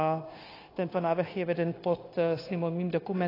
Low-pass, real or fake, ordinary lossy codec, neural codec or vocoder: 5.4 kHz; fake; AAC, 24 kbps; codec, 16 kHz, 0.9 kbps, LongCat-Audio-Codec